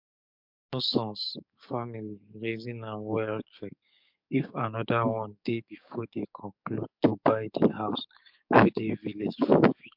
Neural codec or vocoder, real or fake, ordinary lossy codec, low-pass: codec, 44.1 kHz, 7.8 kbps, DAC; fake; MP3, 48 kbps; 5.4 kHz